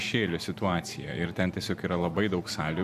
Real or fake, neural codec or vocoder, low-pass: fake; vocoder, 44.1 kHz, 128 mel bands every 512 samples, BigVGAN v2; 14.4 kHz